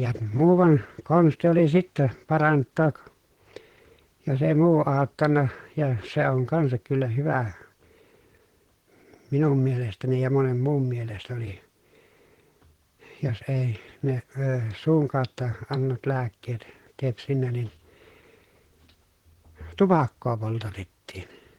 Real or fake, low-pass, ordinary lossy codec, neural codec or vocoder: fake; 19.8 kHz; Opus, 16 kbps; vocoder, 44.1 kHz, 128 mel bands, Pupu-Vocoder